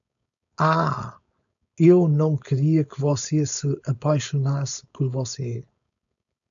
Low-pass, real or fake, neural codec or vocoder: 7.2 kHz; fake; codec, 16 kHz, 4.8 kbps, FACodec